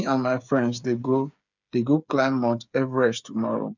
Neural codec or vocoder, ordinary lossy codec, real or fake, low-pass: codec, 16 kHz, 8 kbps, FreqCodec, smaller model; none; fake; 7.2 kHz